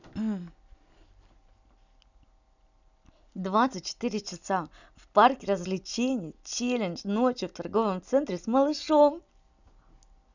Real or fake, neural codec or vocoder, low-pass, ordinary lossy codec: real; none; 7.2 kHz; none